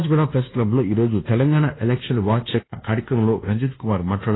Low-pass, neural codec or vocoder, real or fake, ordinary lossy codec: 7.2 kHz; codec, 24 kHz, 1.2 kbps, DualCodec; fake; AAC, 16 kbps